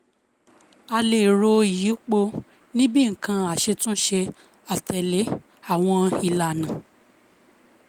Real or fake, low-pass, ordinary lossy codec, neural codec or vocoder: real; 19.8 kHz; Opus, 32 kbps; none